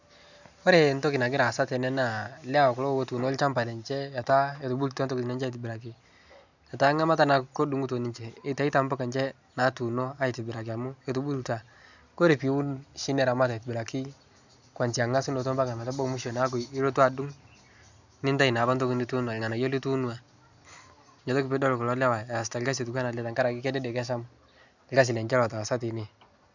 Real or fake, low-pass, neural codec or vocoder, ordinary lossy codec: real; 7.2 kHz; none; none